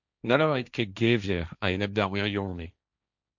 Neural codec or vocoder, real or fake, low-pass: codec, 16 kHz, 1.1 kbps, Voila-Tokenizer; fake; 7.2 kHz